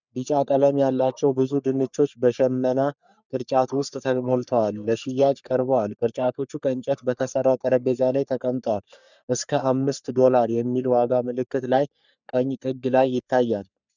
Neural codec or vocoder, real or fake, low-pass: codec, 44.1 kHz, 3.4 kbps, Pupu-Codec; fake; 7.2 kHz